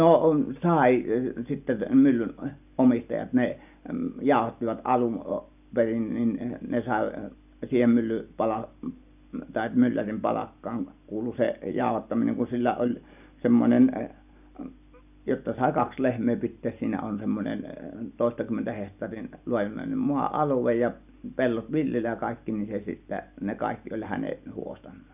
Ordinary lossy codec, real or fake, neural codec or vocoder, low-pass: none; real; none; 3.6 kHz